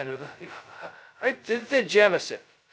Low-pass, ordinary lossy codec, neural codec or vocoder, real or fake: none; none; codec, 16 kHz, 0.2 kbps, FocalCodec; fake